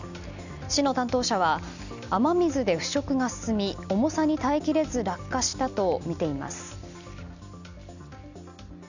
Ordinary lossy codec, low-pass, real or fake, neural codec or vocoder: none; 7.2 kHz; real; none